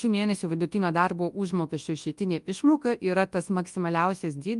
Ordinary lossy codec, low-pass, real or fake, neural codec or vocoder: Opus, 32 kbps; 10.8 kHz; fake; codec, 24 kHz, 0.9 kbps, WavTokenizer, large speech release